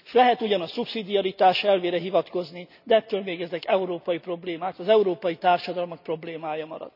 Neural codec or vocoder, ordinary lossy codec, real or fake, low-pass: none; none; real; 5.4 kHz